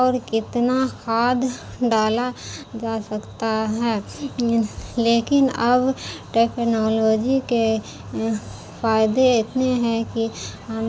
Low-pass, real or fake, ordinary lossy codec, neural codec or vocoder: none; real; none; none